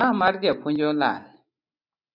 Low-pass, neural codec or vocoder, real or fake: 5.4 kHz; none; real